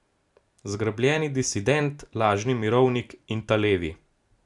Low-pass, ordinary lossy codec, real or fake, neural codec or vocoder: 10.8 kHz; none; real; none